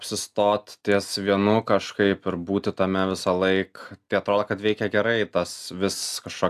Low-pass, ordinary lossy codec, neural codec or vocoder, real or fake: 14.4 kHz; AAC, 96 kbps; none; real